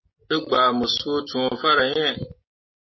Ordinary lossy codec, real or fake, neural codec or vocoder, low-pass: MP3, 24 kbps; real; none; 7.2 kHz